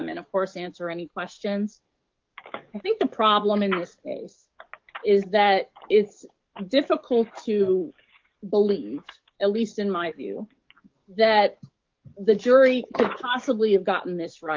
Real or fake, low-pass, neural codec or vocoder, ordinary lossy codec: fake; 7.2 kHz; codec, 44.1 kHz, 7.8 kbps, DAC; Opus, 32 kbps